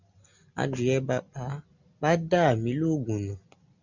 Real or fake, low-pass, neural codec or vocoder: real; 7.2 kHz; none